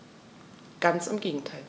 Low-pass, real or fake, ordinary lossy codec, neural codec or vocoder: none; real; none; none